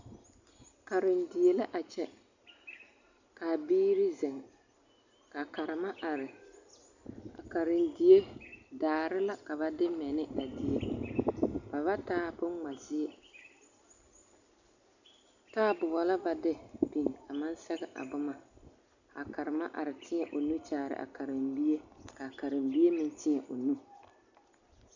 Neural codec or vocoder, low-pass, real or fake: none; 7.2 kHz; real